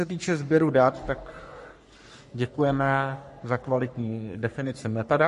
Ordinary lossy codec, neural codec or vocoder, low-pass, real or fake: MP3, 48 kbps; codec, 44.1 kHz, 3.4 kbps, Pupu-Codec; 14.4 kHz; fake